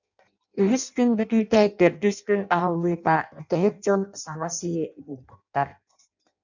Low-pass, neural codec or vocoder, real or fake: 7.2 kHz; codec, 16 kHz in and 24 kHz out, 0.6 kbps, FireRedTTS-2 codec; fake